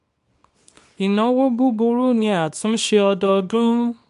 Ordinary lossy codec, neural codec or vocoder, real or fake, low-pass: MP3, 64 kbps; codec, 24 kHz, 0.9 kbps, WavTokenizer, small release; fake; 10.8 kHz